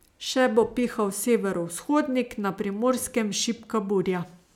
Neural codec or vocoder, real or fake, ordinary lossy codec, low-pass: none; real; none; 19.8 kHz